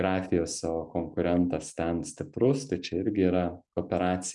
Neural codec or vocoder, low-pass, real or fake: none; 10.8 kHz; real